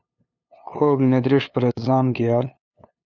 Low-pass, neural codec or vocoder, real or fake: 7.2 kHz; codec, 16 kHz, 2 kbps, FunCodec, trained on LibriTTS, 25 frames a second; fake